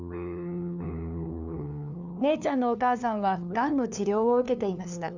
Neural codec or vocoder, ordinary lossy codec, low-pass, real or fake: codec, 16 kHz, 2 kbps, FunCodec, trained on LibriTTS, 25 frames a second; none; 7.2 kHz; fake